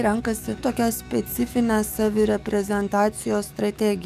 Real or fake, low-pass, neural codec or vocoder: fake; 14.4 kHz; codec, 44.1 kHz, 7.8 kbps, DAC